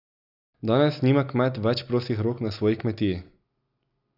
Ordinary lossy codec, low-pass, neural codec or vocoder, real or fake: none; 5.4 kHz; none; real